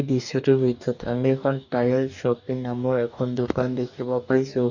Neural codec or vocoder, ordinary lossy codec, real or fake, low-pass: codec, 44.1 kHz, 2.6 kbps, DAC; none; fake; 7.2 kHz